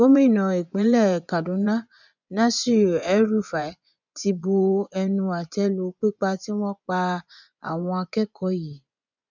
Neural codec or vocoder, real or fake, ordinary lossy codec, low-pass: vocoder, 24 kHz, 100 mel bands, Vocos; fake; none; 7.2 kHz